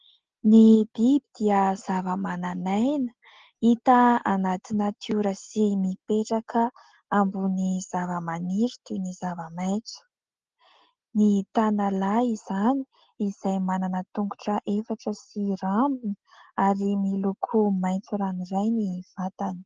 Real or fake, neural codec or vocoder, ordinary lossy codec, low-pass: real; none; Opus, 16 kbps; 7.2 kHz